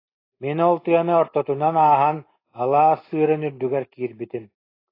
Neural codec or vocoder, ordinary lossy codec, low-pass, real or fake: none; AAC, 24 kbps; 5.4 kHz; real